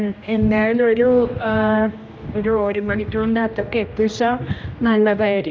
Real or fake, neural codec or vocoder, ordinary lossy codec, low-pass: fake; codec, 16 kHz, 1 kbps, X-Codec, HuBERT features, trained on general audio; none; none